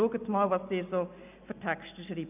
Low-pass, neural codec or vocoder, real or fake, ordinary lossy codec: 3.6 kHz; none; real; none